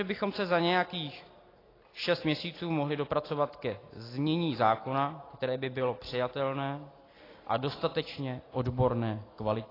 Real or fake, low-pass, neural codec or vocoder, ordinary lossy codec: real; 5.4 kHz; none; AAC, 24 kbps